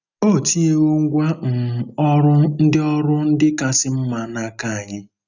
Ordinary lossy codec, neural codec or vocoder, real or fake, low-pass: none; none; real; 7.2 kHz